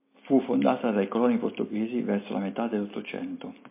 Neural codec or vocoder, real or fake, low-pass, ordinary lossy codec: none; real; 3.6 kHz; MP3, 24 kbps